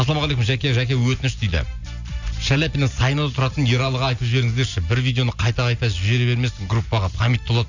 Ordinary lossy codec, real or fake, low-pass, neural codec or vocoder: none; real; 7.2 kHz; none